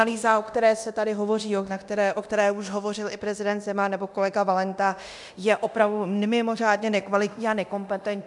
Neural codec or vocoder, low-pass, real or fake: codec, 24 kHz, 0.9 kbps, DualCodec; 10.8 kHz; fake